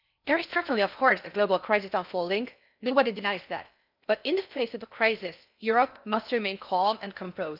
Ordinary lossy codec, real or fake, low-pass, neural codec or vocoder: none; fake; 5.4 kHz; codec, 16 kHz in and 24 kHz out, 0.6 kbps, FocalCodec, streaming, 4096 codes